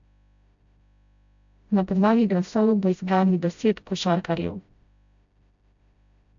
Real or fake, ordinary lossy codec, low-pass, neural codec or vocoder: fake; AAC, 64 kbps; 7.2 kHz; codec, 16 kHz, 0.5 kbps, FreqCodec, smaller model